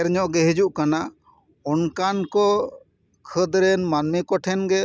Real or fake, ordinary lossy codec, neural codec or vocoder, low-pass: real; none; none; none